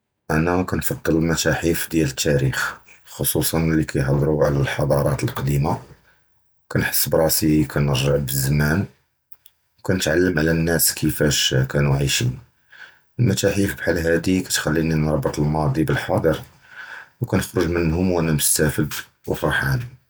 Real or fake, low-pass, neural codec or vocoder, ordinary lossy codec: real; none; none; none